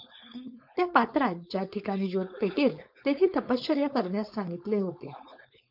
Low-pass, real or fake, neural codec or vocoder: 5.4 kHz; fake; codec, 16 kHz, 4.8 kbps, FACodec